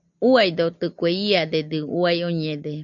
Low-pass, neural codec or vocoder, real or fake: 7.2 kHz; none; real